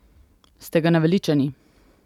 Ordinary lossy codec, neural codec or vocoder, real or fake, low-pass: none; none; real; 19.8 kHz